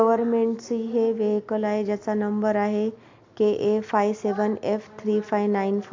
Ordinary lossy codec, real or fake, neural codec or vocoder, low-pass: MP3, 48 kbps; real; none; 7.2 kHz